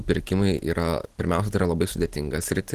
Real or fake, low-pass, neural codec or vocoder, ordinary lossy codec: real; 14.4 kHz; none; Opus, 16 kbps